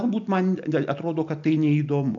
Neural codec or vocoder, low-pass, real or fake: none; 7.2 kHz; real